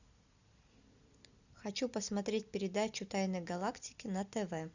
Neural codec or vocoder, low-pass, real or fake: none; 7.2 kHz; real